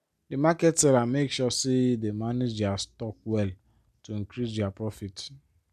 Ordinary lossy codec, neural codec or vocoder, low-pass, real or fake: MP3, 96 kbps; none; 14.4 kHz; real